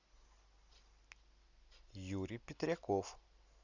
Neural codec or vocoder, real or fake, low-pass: none; real; 7.2 kHz